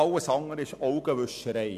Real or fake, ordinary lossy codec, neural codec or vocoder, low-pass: real; none; none; 14.4 kHz